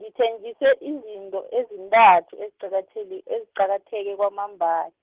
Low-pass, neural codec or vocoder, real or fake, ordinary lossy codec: 3.6 kHz; none; real; Opus, 16 kbps